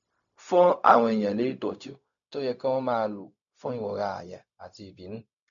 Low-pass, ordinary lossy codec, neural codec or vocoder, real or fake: 7.2 kHz; none; codec, 16 kHz, 0.4 kbps, LongCat-Audio-Codec; fake